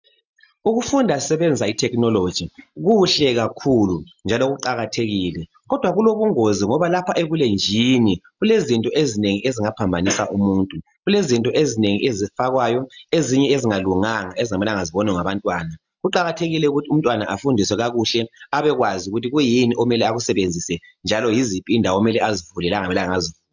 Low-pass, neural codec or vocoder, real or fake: 7.2 kHz; none; real